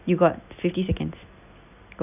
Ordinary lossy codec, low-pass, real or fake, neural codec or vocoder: none; 3.6 kHz; real; none